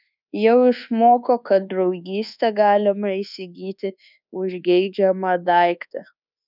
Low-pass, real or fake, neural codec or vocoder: 5.4 kHz; fake; codec, 24 kHz, 1.2 kbps, DualCodec